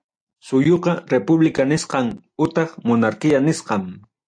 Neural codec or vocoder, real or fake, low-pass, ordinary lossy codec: none; real; 9.9 kHz; AAC, 64 kbps